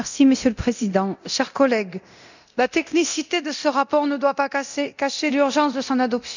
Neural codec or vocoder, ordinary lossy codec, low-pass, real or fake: codec, 24 kHz, 0.9 kbps, DualCodec; none; 7.2 kHz; fake